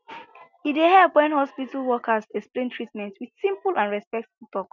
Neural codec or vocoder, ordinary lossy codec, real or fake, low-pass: none; none; real; 7.2 kHz